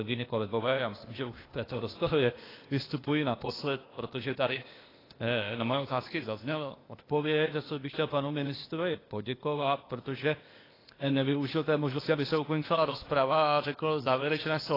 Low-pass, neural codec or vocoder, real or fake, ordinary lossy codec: 5.4 kHz; codec, 16 kHz, 0.8 kbps, ZipCodec; fake; AAC, 24 kbps